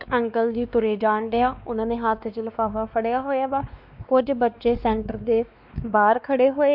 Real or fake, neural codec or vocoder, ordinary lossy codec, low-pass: fake; codec, 16 kHz, 2 kbps, X-Codec, WavLM features, trained on Multilingual LibriSpeech; none; 5.4 kHz